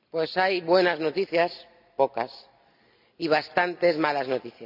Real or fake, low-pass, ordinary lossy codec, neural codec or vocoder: real; 5.4 kHz; none; none